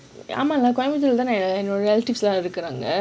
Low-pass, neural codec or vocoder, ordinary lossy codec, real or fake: none; none; none; real